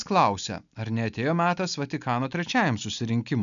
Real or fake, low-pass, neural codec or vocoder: real; 7.2 kHz; none